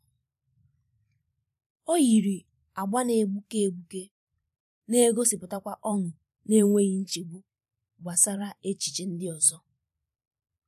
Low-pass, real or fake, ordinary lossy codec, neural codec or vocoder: 14.4 kHz; fake; none; vocoder, 44.1 kHz, 128 mel bands every 512 samples, BigVGAN v2